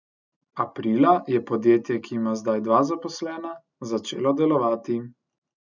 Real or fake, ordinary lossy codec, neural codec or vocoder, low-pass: real; none; none; 7.2 kHz